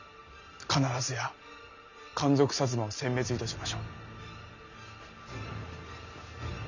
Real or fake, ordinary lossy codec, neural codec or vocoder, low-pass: real; none; none; 7.2 kHz